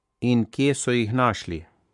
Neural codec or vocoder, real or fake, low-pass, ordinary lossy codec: codec, 44.1 kHz, 7.8 kbps, Pupu-Codec; fake; 10.8 kHz; MP3, 64 kbps